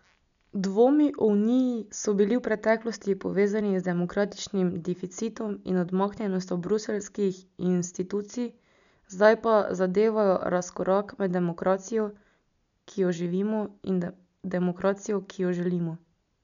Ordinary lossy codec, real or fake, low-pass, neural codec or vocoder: none; real; 7.2 kHz; none